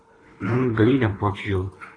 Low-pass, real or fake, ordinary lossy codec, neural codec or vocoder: 9.9 kHz; fake; AAC, 32 kbps; codec, 24 kHz, 3 kbps, HILCodec